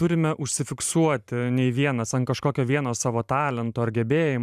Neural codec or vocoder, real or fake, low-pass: vocoder, 44.1 kHz, 128 mel bands every 512 samples, BigVGAN v2; fake; 14.4 kHz